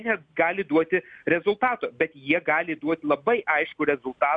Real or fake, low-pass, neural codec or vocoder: real; 9.9 kHz; none